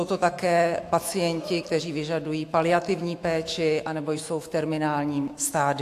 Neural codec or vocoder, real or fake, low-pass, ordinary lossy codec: autoencoder, 48 kHz, 128 numbers a frame, DAC-VAE, trained on Japanese speech; fake; 14.4 kHz; AAC, 64 kbps